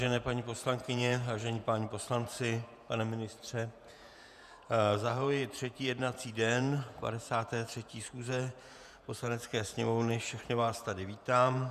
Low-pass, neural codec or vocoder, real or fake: 14.4 kHz; vocoder, 48 kHz, 128 mel bands, Vocos; fake